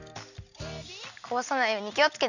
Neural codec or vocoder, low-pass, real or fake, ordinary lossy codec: none; 7.2 kHz; real; none